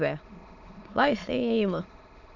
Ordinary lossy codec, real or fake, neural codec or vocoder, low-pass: none; fake; autoencoder, 22.05 kHz, a latent of 192 numbers a frame, VITS, trained on many speakers; 7.2 kHz